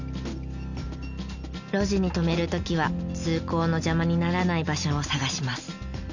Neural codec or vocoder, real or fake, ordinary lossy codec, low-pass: none; real; none; 7.2 kHz